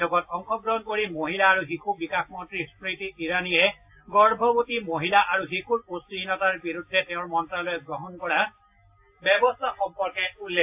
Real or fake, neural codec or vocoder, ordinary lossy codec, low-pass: real; none; AAC, 32 kbps; 3.6 kHz